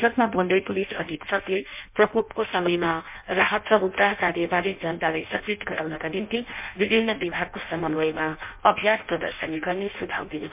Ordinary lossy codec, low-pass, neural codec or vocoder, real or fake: MP3, 32 kbps; 3.6 kHz; codec, 16 kHz in and 24 kHz out, 0.6 kbps, FireRedTTS-2 codec; fake